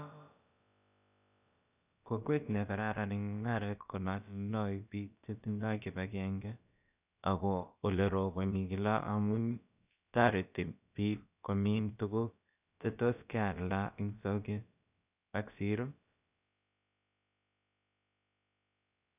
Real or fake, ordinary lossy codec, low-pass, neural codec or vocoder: fake; none; 3.6 kHz; codec, 16 kHz, about 1 kbps, DyCAST, with the encoder's durations